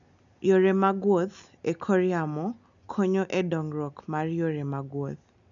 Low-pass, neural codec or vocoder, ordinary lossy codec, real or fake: 7.2 kHz; none; none; real